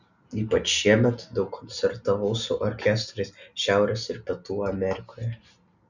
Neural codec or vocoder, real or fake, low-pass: none; real; 7.2 kHz